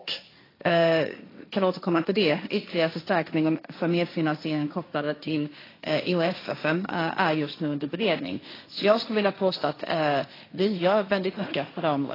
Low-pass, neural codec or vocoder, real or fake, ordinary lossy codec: 5.4 kHz; codec, 16 kHz, 1.1 kbps, Voila-Tokenizer; fake; AAC, 24 kbps